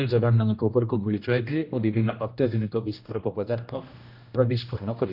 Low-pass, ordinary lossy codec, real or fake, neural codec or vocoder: 5.4 kHz; none; fake; codec, 16 kHz, 1 kbps, X-Codec, HuBERT features, trained on general audio